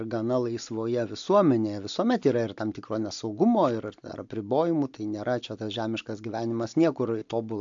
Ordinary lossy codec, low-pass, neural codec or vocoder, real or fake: AAC, 64 kbps; 7.2 kHz; none; real